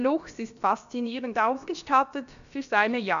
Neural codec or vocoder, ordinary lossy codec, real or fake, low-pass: codec, 16 kHz, about 1 kbps, DyCAST, with the encoder's durations; none; fake; 7.2 kHz